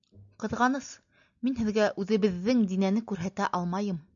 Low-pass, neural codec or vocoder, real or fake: 7.2 kHz; none; real